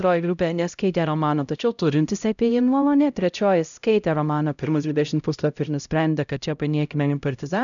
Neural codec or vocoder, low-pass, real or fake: codec, 16 kHz, 0.5 kbps, X-Codec, HuBERT features, trained on LibriSpeech; 7.2 kHz; fake